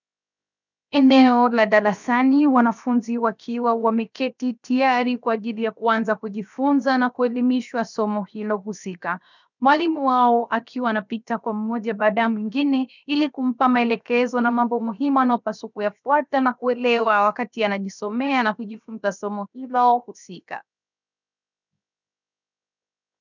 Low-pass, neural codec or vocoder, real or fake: 7.2 kHz; codec, 16 kHz, 0.7 kbps, FocalCodec; fake